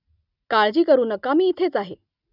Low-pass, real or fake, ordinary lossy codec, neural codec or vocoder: 5.4 kHz; real; none; none